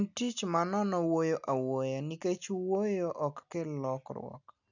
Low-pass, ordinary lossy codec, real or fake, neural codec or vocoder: 7.2 kHz; none; real; none